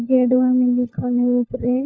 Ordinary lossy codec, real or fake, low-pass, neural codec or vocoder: none; fake; none; codec, 16 kHz, 4 kbps, FunCodec, trained on LibriTTS, 50 frames a second